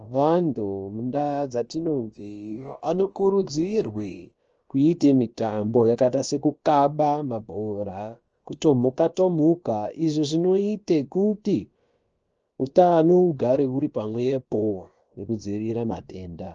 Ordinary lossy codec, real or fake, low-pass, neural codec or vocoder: Opus, 24 kbps; fake; 7.2 kHz; codec, 16 kHz, about 1 kbps, DyCAST, with the encoder's durations